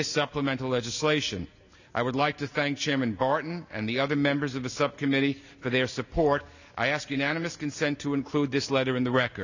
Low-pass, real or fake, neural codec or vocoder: 7.2 kHz; real; none